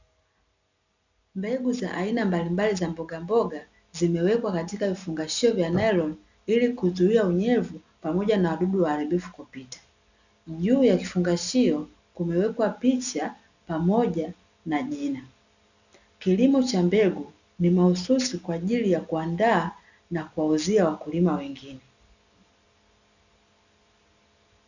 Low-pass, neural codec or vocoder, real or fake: 7.2 kHz; none; real